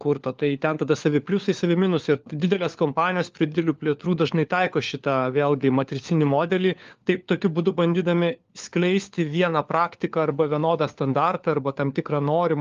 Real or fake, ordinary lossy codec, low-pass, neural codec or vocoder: fake; Opus, 32 kbps; 7.2 kHz; codec, 16 kHz, 4 kbps, FunCodec, trained on LibriTTS, 50 frames a second